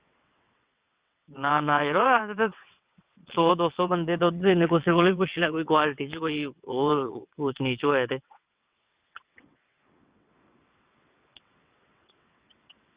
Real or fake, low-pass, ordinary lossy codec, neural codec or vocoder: fake; 3.6 kHz; Opus, 64 kbps; vocoder, 22.05 kHz, 80 mel bands, WaveNeXt